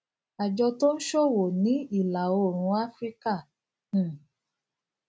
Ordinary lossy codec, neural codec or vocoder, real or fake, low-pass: none; none; real; none